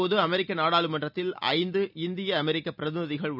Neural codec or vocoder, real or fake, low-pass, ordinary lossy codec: none; real; 5.4 kHz; none